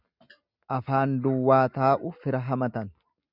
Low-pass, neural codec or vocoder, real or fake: 5.4 kHz; none; real